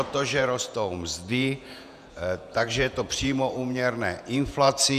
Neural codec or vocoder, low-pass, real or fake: vocoder, 48 kHz, 128 mel bands, Vocos; 14.4 kHz; fake